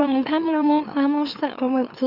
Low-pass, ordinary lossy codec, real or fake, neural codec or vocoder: 5.4 kHz; AAC, 48 kbps; fake; autoencoder, 44.1 kHz, a latent of 192 numbers a frame, MeloTTS